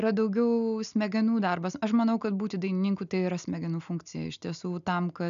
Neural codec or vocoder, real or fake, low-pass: none; real; 7.2 kHz